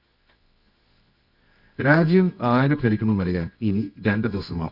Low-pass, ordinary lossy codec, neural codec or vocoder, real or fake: 5.4 kHz; none; codec, 24 kHz, 0.9 kbps, WavTokenizer, medium music audio release; fake